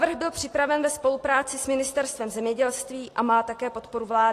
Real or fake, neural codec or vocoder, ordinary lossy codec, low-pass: real; none; AAC, 48 kbps; 14.4 kHz